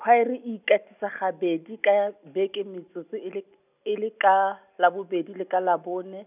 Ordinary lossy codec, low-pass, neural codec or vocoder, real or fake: none; 3.6 kHz; none; real